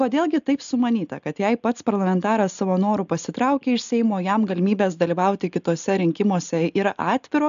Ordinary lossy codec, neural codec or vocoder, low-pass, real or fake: MP3, 96 kbps; none; 7.2 kHz; real